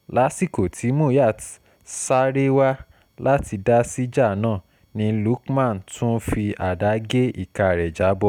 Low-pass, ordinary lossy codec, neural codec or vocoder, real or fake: 19.8 kHz; none; none; real